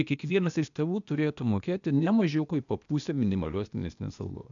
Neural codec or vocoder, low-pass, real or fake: codec, 16 kHz, 0.8 kbps, ZipCodec; 7.2 kHz; fake